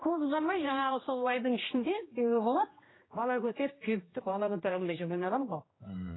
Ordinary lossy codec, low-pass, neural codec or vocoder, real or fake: AAC, 16 kbps; 7.2 kHz; codec, 16 kHz, 1 kbps, X-Codec, HuBERT features, trained on general audio; fake